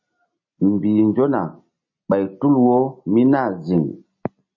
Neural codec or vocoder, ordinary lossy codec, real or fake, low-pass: none; MP3, 32 kbps; real; 7.2 kHz